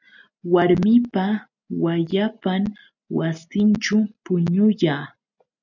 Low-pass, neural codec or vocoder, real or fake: 7.2 kHz; none; real